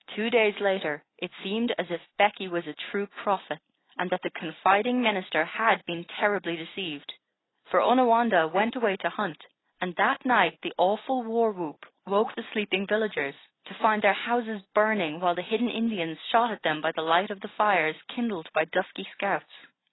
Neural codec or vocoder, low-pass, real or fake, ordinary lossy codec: none; 7.2 kHz; real; AAC, 16 kbps